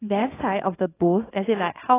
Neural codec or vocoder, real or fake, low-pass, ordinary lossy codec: codec, 24 kHz, 0.9 kbps, WavTokenizer, small release; fake; 3.6 kHz; AAC, 16 kbps